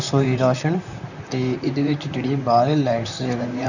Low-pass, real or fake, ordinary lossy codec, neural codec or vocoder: 7.2 kHz; fake; none; vocoder, 44.1 kHz, 128 mel bands, Pupu-Vocoder